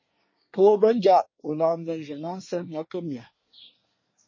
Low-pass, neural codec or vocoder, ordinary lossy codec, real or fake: 7.2 kHz; codec, 24 kHz, 1 kbps, SNAC; MP3, 32 kbps; fake